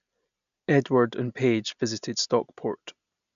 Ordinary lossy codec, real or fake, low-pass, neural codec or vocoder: none; real; 7.2 kHz; none